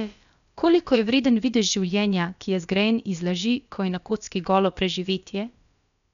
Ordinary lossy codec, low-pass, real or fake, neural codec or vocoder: none; 7.2 kHz; fake; codec, 16 kHz, about 1 kbps, DyCAST, with the encoder's durations